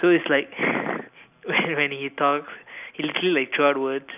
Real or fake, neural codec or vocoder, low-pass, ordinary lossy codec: real; none; 3.6 kHz; none